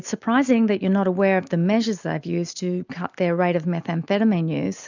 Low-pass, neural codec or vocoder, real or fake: 7.2 kHz; none; real